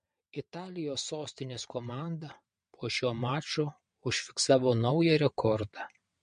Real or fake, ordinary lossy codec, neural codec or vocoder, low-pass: fake; MP3, 48 kbps; vocoder, 22.05 kHz, 80 mel bands, WaveNeXt; 9.9 kHz